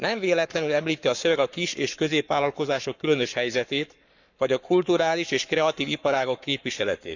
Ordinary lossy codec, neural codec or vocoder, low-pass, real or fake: none; codec, 44.1 kHz, 7.8 kbps, Pupu-Codec; 7.2 kHz; fake